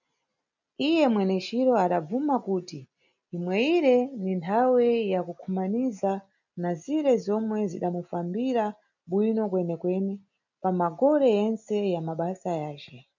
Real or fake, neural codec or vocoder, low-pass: real; none; 7.2 kHz